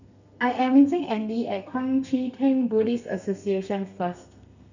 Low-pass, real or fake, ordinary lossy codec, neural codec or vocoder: 7.2 kHz; fake; none; codec, 32 kHz, 1.9 kbps, SNAC